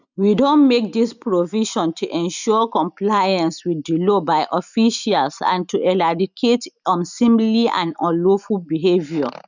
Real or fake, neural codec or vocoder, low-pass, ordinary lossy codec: real; none; 7.2 kHz; none